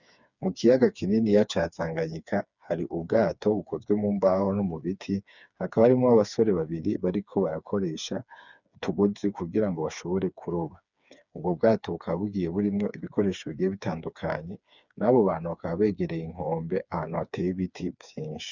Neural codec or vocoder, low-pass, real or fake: codec, 16 kHz, 4 kbps, FreqCodec, smaller model; 7.2 kHz; fake